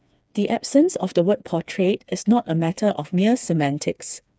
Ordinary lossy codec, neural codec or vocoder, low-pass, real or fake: none; codec, 16 kHz, 4 kbps, FreqCodec, smaller model; none; fake